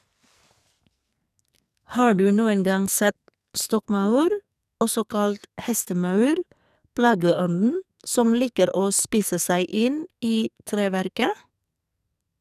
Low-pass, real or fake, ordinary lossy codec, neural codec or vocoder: 14.4 kHz; fake; none; codec, 44.1 kHz, 2.6 kbps, SNAC